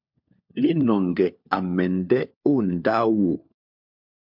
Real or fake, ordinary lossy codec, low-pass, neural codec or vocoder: fake; MP3, 48 kbps; 5.4 kHz; codec, 16 kHz, 4 kbps, FunCodec, trained on LibriTTS, 50 frames a second